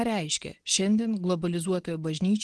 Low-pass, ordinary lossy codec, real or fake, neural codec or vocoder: 10.8 kHz; Opus, 16 kbps; real; none